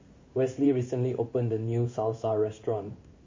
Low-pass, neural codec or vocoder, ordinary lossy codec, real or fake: 7.2 kHz; codec, 16 kHz in and 24 kHz out, 1 kbps, XY-Tokenizer; MP3, 32 kbps; fake